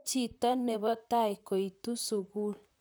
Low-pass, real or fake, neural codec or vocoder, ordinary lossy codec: none; fake; vocoder, 44.1 kHz, 128 mel bands, Pupu-Vocoder; none